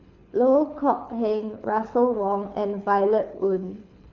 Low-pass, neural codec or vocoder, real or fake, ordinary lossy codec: 7.2 kHz; codec, 24 kHz, 6 kbps, HILCodec; fake; none